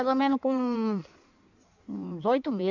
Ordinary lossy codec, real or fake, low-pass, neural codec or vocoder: none; fake; 7.2 kHz; codec, 16 kHz in and 24 kHz out, 1.1 kbps, FireRedTTS-2 codec